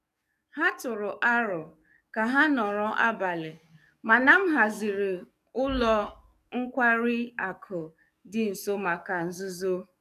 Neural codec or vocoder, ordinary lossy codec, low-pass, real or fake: codec, 44.1 kHz, 7.8 kbps, DAC; none; 14.4 kHz; fake